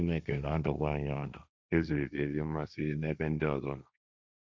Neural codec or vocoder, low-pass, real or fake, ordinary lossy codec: codec, 16 kHz, 1.1 kbps, Voila-Tokenizer; none; fake; none